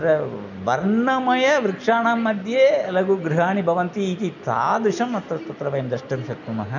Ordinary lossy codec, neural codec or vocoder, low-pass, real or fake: none; none; 7.2 kHz; real